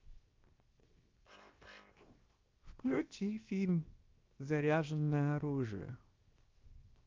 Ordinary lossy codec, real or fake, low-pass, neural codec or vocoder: Opus, 32 kbps; fake; 7.2 kHz; codec, 16 kHz, 0.7 kbps, FocalCodec